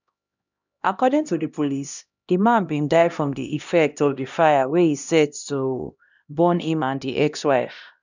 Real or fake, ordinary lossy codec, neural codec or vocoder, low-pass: fake; none; codec, 16 kHz, 1 kbps, X-Codec, HuBERT features, trained on LibriSpeech; 7.2 kHz